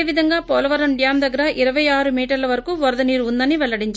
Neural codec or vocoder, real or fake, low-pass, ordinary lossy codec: none; real; none; none